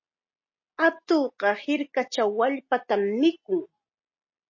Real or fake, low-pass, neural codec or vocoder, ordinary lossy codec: real; 7.2 kHz; none; MP3, 32 kbps